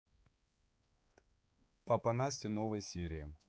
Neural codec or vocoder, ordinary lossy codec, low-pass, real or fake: codec, 16 kHz, 4 kbps, X-Codec, HuBERT features, trained on balanced general audio; none; none; fake